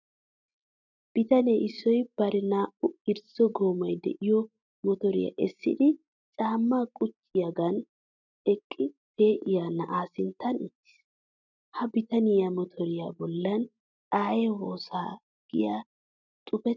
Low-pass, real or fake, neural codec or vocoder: 7.2 kHz; real; none